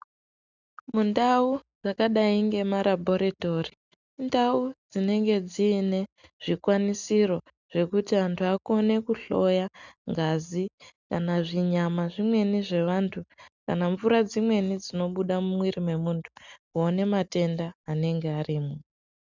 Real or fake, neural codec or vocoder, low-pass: real; none; 7.2 kHz